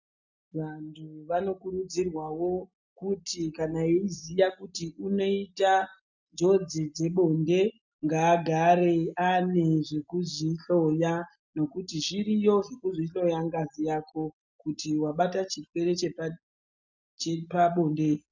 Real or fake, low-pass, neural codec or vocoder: real; 7.2 kHz; none